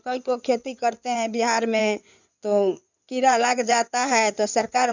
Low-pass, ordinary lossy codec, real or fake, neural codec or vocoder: 7.2 kHz; none; fake; codec, 16 kHz in and 24 kHz out, 2.2 kbps, FireRedTTS-2 codec